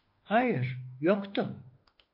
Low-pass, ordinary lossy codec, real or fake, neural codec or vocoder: 5.4 kHz; MP3, 32 kbps; fake; autoencoder, 48 kHz, 32 numbers a frame, DAC-VAE, trained on Japanese speech